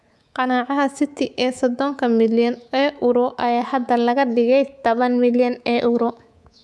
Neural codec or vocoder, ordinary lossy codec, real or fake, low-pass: codec, 24 kHz, 3.1 kbps, DualCodec; none; fake; none